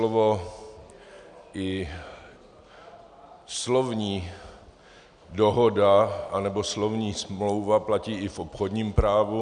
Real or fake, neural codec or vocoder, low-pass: real; none; 9.9 kHz